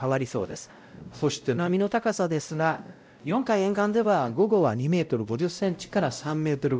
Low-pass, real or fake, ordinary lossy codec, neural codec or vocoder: none; fake; none; codec, 16 kHz, 0.5 kbps, X-Codec, WavLM features, trained on Multilingual LibriSpeech